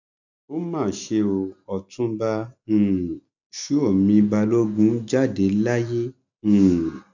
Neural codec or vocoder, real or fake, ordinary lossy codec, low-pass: none; real; none; 7.2 kHz